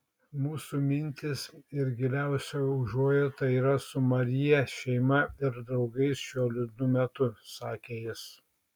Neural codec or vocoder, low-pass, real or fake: none; 19.8 kHz; real